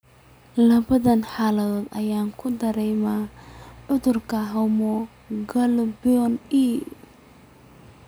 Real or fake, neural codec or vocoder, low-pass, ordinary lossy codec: real; none; none; none